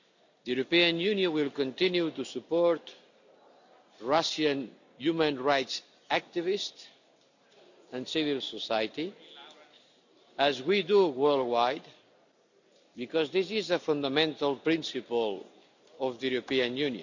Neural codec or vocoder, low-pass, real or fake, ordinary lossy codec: none; 7.2 kHz; real; none